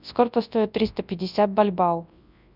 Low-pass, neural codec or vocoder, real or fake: 5.4 kHz; codec, 24 kHz, 0.9 kbps, WavTokenizer, large speech release; fake